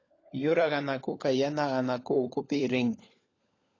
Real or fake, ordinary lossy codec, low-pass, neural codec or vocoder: fake; AAC, 32 kbps; 7.2 kHz; codec, 16 kHz, 16 kbps, FunCodec, trained on LibriTTS, 50 frames a second